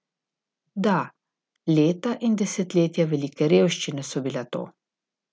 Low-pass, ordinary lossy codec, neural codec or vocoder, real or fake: none; none; none; real